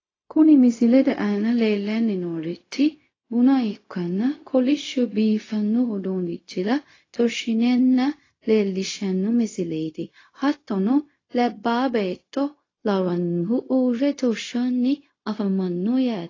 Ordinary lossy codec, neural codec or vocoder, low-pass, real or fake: AAC, 32 kbps; codec, 16 kHz, 0.4 kbps, LongCat-Audio-Codec; 7.2 kHz; fake